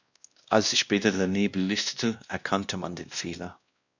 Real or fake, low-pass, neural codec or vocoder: fake; 7.2 kHz; codec, 16 kHz, 1 kbps, X-Codec, WavLM features, trained on Multilingual LibriSpeech